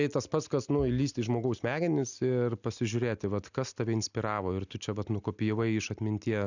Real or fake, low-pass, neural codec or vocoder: real; 7.2 kHz; none